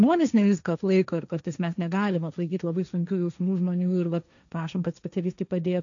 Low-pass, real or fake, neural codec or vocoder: 7.2 kHz; fake; codec, 16 kHz, 1.1 kbps, Voila-Tokenizer